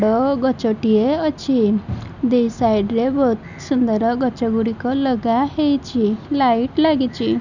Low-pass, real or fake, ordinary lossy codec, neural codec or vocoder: 7.2 kHz; real; none; none